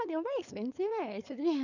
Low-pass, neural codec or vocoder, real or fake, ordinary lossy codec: 7.2 kHz; codec, 16 kHz, 4.8 kbps, FACodec; fake; none